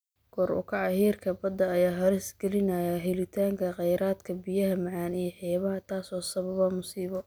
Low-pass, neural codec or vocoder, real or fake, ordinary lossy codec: none; none; real; none